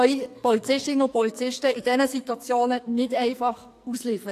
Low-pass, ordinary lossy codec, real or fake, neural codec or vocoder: 14.4 kHz; AAC, 64 kbps; fake; codec, 44.1 kHz, 2.6 kbps, SNAC